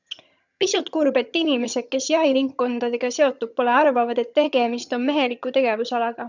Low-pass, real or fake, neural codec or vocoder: 7.2 kHz; fake; vocoder, 22.05 kHz, 80 mel bands, HiFi-GAN